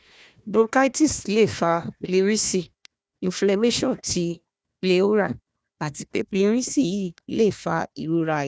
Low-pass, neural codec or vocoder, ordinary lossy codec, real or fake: none; codec, 16 kHz, 1 kbps, FunCodec, trained on Chinese and English, 50 frames a second; none; fake